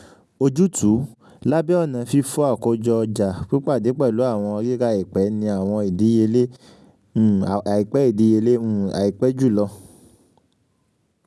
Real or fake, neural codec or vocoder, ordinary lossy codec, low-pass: real; none; none; none